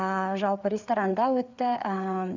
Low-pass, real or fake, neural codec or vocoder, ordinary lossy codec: 7.2 kHz; fake; codec, 16 kHz, 4 kbps, FreqCodec, larger model; none